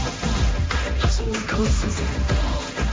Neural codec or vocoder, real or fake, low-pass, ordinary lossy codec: codec, 16 kHz, 1.1 kbps, Voila-Tokenizer; fake; none; none